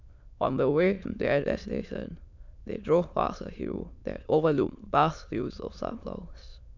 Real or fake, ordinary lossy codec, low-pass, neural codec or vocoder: fake; none; 7.2 kHz; autoencoder, 22.05 kHz, a latent of 192 numbers a frame, VITS, trained on many speakers